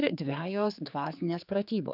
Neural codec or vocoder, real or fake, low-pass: codec, 16 kHz, 4 kbps, X-Codec, HuBERT features, trained on general audio; fake; 5.4 kHz